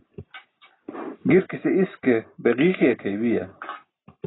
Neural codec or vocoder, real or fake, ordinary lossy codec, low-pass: none; real; AAC, 16 kbps; 7.2 kHz